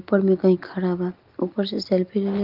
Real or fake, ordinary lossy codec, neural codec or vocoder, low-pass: real; Opus, 24 kbps; none; 5.4 kHz